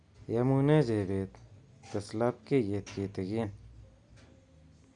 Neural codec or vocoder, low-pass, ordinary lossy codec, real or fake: none; 9.9 kHz; Opus, 64 kbps; real